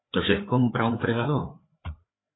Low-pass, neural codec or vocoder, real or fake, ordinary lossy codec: 7.2 kHz; codec, 16 kHz, 4 kbps, FreqCodec, larger model; fake; AAC, 16 kbps